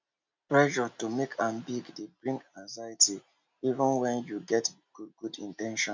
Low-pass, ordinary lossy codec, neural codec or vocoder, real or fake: 7.2 kHz; MP3, 64 kbps; none; real